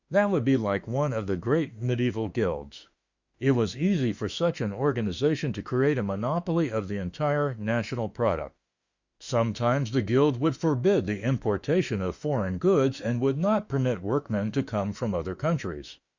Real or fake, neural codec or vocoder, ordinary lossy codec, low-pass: fake; autoencoder, 48 kHz, 32 numbers a frame, DAC-VAE, trained on Japanese speech; Opus, 64 kbps; 7.2 kHz